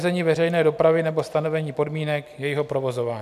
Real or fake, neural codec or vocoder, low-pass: fake; vocoder, 44.1 kHz, 128 mel bands every 256 samples, BigVGAN v2; 14.4 kHz